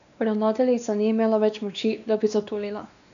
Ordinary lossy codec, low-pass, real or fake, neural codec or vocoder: none; 7.2 kHz; fake; codec, 16 kHz, 2 kbps, X-Codec, WavLM features, trained on Multilingual LibriSpeech